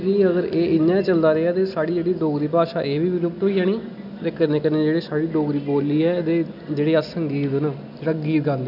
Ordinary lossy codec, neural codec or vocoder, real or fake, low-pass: none; none; real; 5.4 kHz